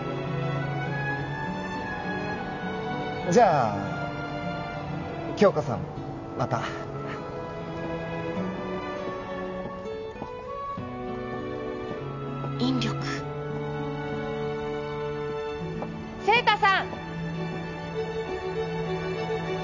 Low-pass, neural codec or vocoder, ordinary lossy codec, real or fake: 7.2 kHz; none; none; real